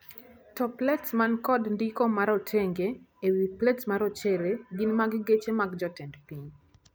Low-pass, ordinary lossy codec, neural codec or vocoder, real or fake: none; none; none; real